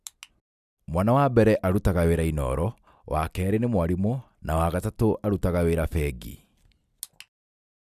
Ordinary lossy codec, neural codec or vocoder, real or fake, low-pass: none; none; real; 14.4 kHz